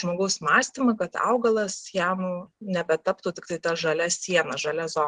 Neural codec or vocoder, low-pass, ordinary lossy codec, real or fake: none; 10.8 kHz; Opus, 16 kbps; real